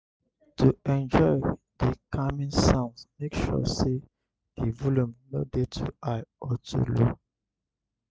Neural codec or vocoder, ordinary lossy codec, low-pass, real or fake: none; none; none; real